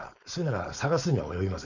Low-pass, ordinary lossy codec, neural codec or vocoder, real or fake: 7.2 kHz; none; codec, 16 kHz, 4.8 kbps, FACodec; fake